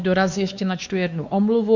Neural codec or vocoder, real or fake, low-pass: codec, 16 kHz, 2 kbps, X-Codec, WavLM features, trained on Multilingual LibriSpeech; fake; 7.2 kHz